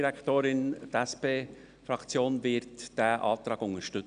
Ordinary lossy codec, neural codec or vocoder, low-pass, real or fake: none; none; 9.9 kHz; real